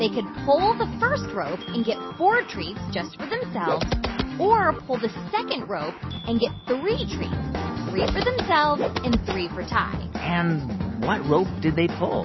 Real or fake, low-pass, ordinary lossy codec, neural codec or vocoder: real; 7.2 kHz; MP3, 24 kbps; none